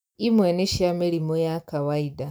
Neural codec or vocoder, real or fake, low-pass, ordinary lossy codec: none; real; none; none